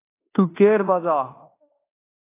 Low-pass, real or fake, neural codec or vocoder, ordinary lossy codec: 3.6 kHz; fake; codec, 16 kHz in and 24 kHz out, 0.9 kbps, LongCat-Audio-Codec, fine tuned four codebook decoder; AAC, 24 kbps